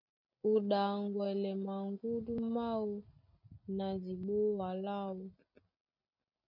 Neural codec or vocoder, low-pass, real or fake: none; 5.4 kHz; real